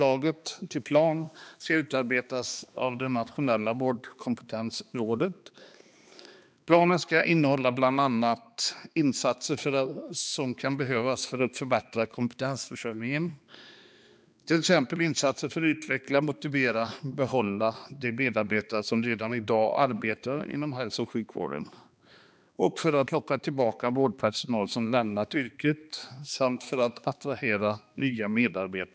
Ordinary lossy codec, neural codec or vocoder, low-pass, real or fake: none; codec, 16 kHz, 2 kbps, X-Codec, HuBERT features, trained on balanced general audio; none; fake